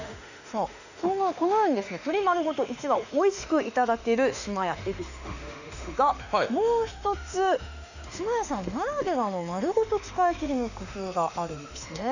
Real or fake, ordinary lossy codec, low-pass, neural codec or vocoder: fake; none; 7.2 kHz; autoencoder, 48 kHz, 32 numbers a frame, DAC-VAE, trained on Japanese speech